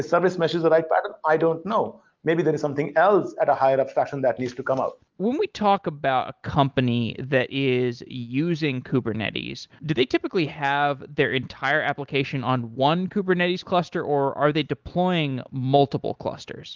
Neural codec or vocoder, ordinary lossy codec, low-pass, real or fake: none; Opus, 32 kbps; 7.2 kHz; real